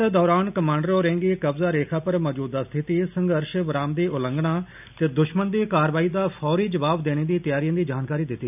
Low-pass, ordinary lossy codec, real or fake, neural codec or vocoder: 3.6 kHz; none; real; none